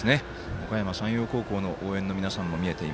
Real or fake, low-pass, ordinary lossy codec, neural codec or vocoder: real; none; none; none